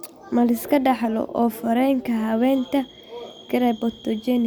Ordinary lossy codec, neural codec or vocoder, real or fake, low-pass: none; none; real; none